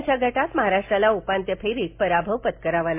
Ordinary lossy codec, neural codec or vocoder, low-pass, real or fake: MP3, 24 kbps; none; 3.6 kHz; real